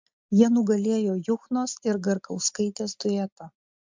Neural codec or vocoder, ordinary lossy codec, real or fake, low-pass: none; MP3, 64 kbps; real; 7.2 kHz